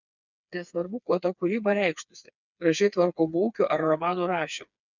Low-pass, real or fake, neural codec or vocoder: 7.2 kHz; fake; codec, 16 kHz, 4 kbps, FreqCodec, smaller model